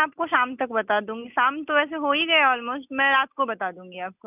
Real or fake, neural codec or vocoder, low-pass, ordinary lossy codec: real; none; 3.6 kHz; none